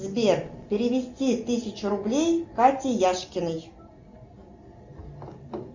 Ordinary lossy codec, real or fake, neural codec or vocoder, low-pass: Opus, 64 kbps; real; none; 7.2 kHz